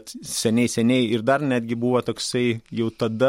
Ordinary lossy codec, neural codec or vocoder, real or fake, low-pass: MP3, 64 kbps; none; real; 19.8 kHz